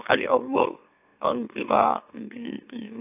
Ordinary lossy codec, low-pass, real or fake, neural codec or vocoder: AAC, 32 kbps; 3.6 kHz; fake; autoencoder, 44.1 kHz, a latent of 192 numbers a frame, MeloTTS